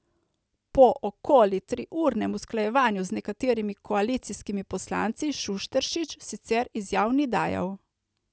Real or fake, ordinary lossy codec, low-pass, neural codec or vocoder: real; none; none; none